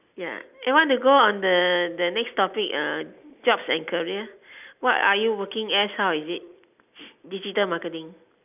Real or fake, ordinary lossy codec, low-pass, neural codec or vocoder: real; none; 3.6 kHz; none